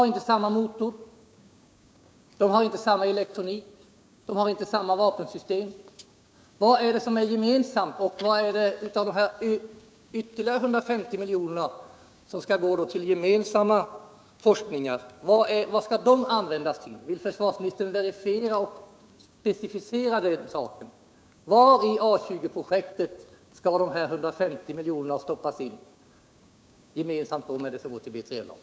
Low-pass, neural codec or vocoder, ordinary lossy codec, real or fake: none; codec, 16 kHz, 6 kbps, DAC; none; fake